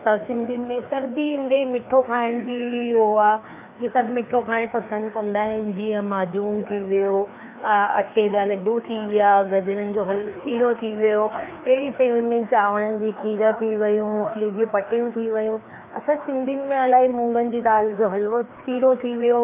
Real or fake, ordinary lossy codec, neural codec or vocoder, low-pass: fake; AAC, 24 kbps; codec, 16 kHz, 2 kbps, FreqCodec, larger model; 3.6 kHz